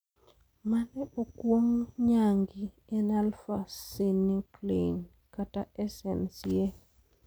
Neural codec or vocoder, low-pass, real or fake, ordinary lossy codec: none; none; real; none